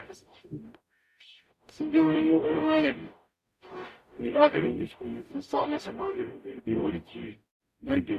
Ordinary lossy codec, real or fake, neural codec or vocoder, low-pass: AAC, 96 kbps; fake; codec, 44.1 kHz, 0.9 kbps, DAC; 14.4 kHz